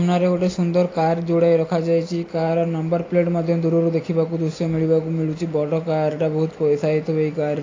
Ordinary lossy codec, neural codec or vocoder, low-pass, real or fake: AAC, 32 kbps; none; 7.2 kHz; real